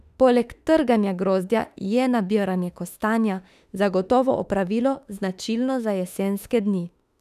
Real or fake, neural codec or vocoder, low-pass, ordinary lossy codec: fake; autoencoder, 48 kHz, 32 numbers a frame, DAC-VAE, trained on Japanese speech; 14.4 kHz; none